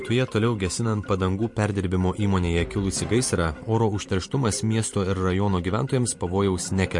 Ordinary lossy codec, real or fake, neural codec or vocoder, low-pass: MP3, 48 kbps; fake; vocoder, 44.1 kHz, 128 mel bands every 512 samples, BigVGAN v2; 10.8 kHz